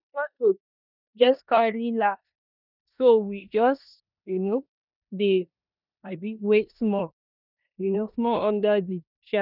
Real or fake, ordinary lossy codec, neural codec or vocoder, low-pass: fake; none; codec, 16 kHz in and 24 kHz out, 0.9 kbps, LongCat-Audio-Codec, four codebook decoder; 5.4 kHz